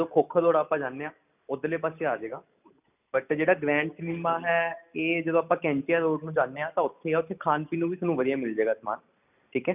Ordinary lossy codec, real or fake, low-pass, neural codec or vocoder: none; real; 3.6 kHz; none